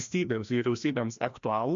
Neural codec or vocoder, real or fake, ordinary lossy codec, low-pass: codec, 16 kHz, 1 kbps, FreqCodec, larger model; fake; MP3, 64 kbps; 7.2 kHz